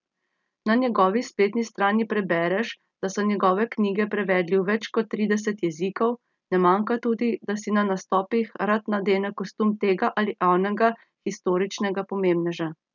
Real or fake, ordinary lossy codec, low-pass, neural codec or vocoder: real; none; 7.2 kHz; none